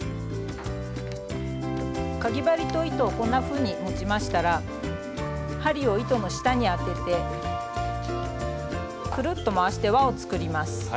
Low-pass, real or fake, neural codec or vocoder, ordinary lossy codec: none; real; none; none